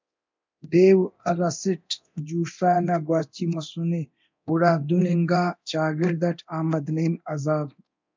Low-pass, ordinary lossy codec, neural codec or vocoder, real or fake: 7.2 kHz; MP3, 64 kbps; codec, 24 kHz, 0.9 kbps, DualCodec; fake